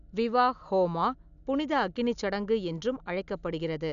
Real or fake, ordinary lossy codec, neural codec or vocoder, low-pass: real; none; none; 7.2 kHz